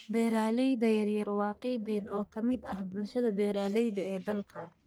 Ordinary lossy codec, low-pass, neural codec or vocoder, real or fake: none; none; codec, 44.1 kHz, 1.7 kbps, Pupu-Codec; fake